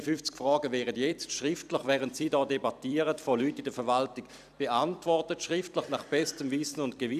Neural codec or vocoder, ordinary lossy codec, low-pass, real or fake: vocoder, 44.1 kHz, 128 mel bands every 256 samples, BigVGAN v2; none; 14.4 kHz; fake